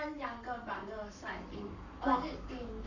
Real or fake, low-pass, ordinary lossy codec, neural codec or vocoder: fake; 7.2 kHz; none; codec, 44.1 kHz, 7.8 kbps, Pupu-Codec